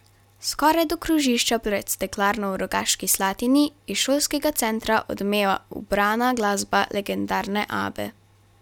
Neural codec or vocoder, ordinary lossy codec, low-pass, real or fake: none; none; 19.8 kHz; real